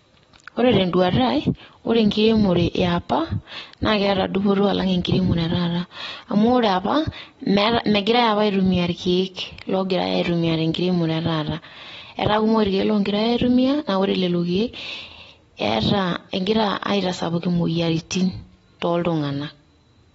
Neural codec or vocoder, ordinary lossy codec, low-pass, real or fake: none; AAC, 24 kbps; 14.4 kHz; real